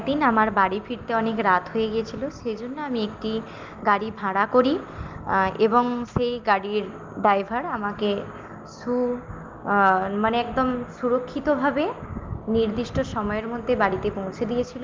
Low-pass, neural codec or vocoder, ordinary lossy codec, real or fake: 7.2 kHz; none; Opus, 32 kbps; real